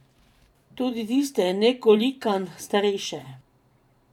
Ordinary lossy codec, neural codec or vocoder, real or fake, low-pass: none; vocoder, 44.1 kHz, 128 mel bands every 512 samples, BigVGAN v2; fake; 19.8 kHz